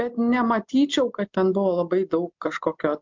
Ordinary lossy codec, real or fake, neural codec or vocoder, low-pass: MP3, 64 kbps; real; none; 7.2 kHz